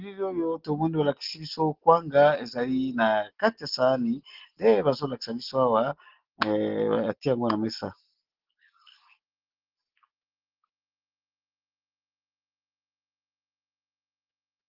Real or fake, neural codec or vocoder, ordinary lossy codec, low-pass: real; none; Opus, 16 kbps; 5.4 kHz